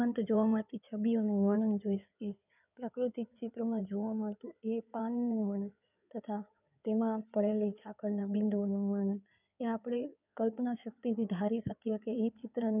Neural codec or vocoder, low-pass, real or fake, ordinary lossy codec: codec, 16 kHz in and 24 kHz out, 2.2 kbps, FireRedTTS-2 codec; 3.6 kHz; fake; none